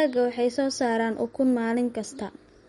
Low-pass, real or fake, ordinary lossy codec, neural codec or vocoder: 14.4 kHz; real; MP3, 48 kbps; none